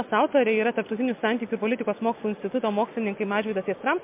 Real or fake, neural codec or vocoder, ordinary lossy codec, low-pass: real; none; MP3, 32 kbps; 3.6 kHz